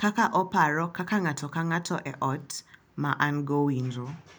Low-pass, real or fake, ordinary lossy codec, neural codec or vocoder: none; real; none; none